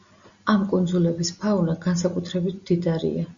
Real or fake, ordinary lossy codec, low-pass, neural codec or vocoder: real; Opus, 64 kbps; 7.2 kHz; none